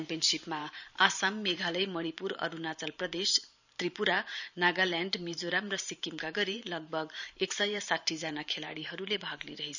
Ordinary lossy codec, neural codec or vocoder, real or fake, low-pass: none; vocoder, 44.1 kHz, 128 mel bands every 512 samples, BigVGAN v2; fake; 7.2 kHz